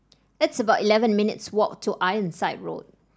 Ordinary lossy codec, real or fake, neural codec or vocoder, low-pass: none; real; none; none